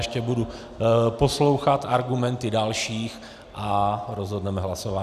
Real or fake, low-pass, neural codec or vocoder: real; 14.4 kHz; none